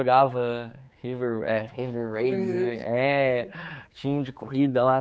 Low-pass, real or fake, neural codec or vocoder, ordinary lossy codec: none; fake; codec, 16 kHz, 4 kbps, X-Codec, HuBERT features, trained on general audio; none